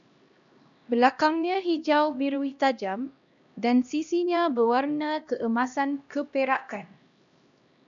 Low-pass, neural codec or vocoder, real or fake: 7.2 kHz; codec, 16 kHz, 1 kbps, X-Codec, HuBERT features, trained on LibriSpeech; fake